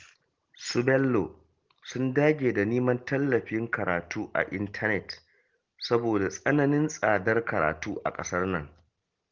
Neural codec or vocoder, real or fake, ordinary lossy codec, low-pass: none; real; Opus, 16 kbps; 7.2 kHz